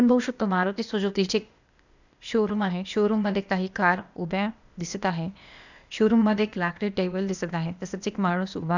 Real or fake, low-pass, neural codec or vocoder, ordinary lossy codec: fake; 7.2 kHz; codec, 16 kHz, 0.8 kbps, ZipCodec; none